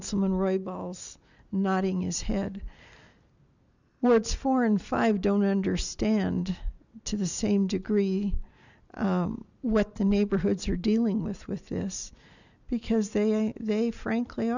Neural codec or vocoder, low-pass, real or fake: none; 7.2 kHz; real